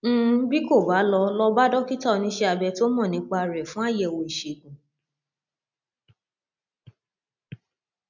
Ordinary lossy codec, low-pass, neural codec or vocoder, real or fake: none; 7.2 kHz; none; real